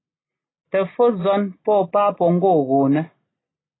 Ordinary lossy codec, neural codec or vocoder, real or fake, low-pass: AAC, 16 kbps; none; real; 7.2 kHz